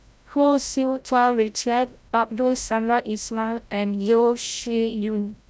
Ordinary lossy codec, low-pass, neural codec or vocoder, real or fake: none; none; codec, 16 kHz, 0.5 kbps, FreqCodec, larger model; fake